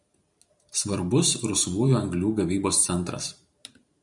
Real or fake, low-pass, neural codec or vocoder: fake; 10.8 kHz; vocoder, 44.1 kHz, 128 mel bands every 512 samples, BigVGAN v2